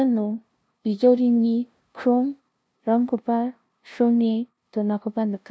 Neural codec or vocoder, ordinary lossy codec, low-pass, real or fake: codec, 16 kHz, 0.5 kbps, FunCodec, trained on LibriTTS, 25 frames a second; none; none; fake